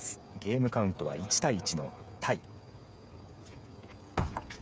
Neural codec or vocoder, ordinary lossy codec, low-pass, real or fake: codec, 16 kHz, 8 kbps, FreqCodec, smaller model; none; none; fake